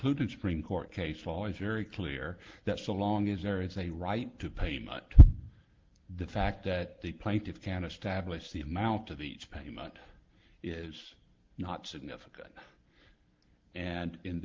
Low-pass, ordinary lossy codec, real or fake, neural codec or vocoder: 7.2 kHz; Opus, 16 kbps; real; none